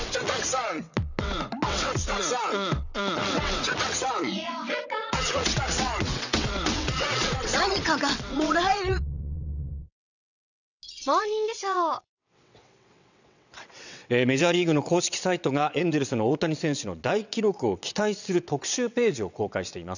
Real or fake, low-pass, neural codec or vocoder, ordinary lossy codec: fake; 7.2 kHz; vocoder, 22.05 kHz, 80 mel bands, WaveNeXt; none